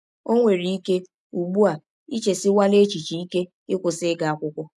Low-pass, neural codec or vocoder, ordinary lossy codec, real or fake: none; none; none; real